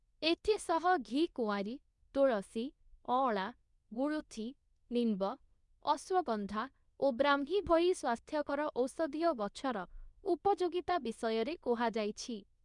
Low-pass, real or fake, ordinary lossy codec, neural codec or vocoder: 10.8 kHz; fake; none; codec, 24 kHz, 0.9 kbps, WavTokenizer, medium speech release version 2